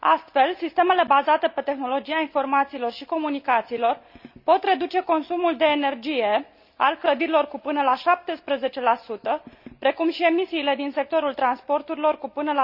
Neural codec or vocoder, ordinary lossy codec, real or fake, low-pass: none; none; real; 5.4 kHz